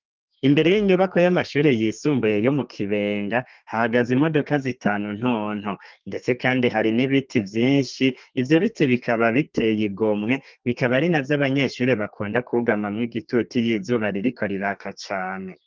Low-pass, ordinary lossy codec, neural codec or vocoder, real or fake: 7.2 kHz; Opus, 24 kbps; codec, 32 kHz, 1.9 kbps, SNAC; fake